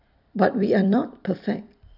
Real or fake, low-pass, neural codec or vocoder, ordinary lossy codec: fake; 5.4 kHz; vocoder, 44.1 kHz, 128 mel bands every 256 samples, BigVGAN v2; none